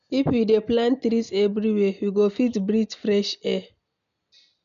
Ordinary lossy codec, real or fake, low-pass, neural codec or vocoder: none; real; 7.2 kHz; none